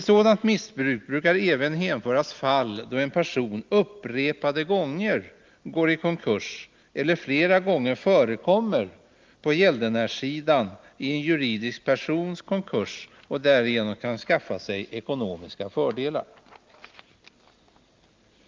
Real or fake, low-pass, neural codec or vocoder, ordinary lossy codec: real; 7.2 kHz; none; Opus, 32 kbps